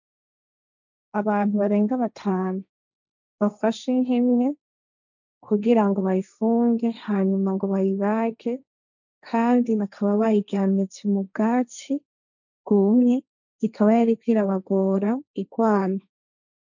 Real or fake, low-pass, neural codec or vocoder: fake; 7.2 kHz; codec, 16 kHz, 1.1 kbps, Voila-Tokenizer